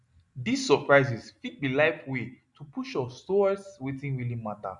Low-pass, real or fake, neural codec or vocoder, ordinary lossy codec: 10.8 kHz; real; none; none